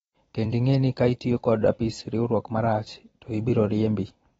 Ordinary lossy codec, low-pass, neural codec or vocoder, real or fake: AAC, 24 kbps; 19.8 kHz; vocoder, 44.1 kHz, 128 mel bands every 256 samples, BigVGAN v2; fake